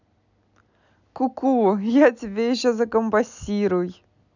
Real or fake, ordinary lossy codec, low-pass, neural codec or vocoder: real; none; 7.2 kHz; none